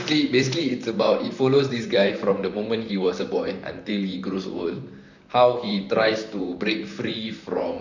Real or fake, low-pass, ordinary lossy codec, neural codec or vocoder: fake; 7.2 kHz; none; vocoder, 44.1 kHz, 128 mel bands, Pupu-Vocoder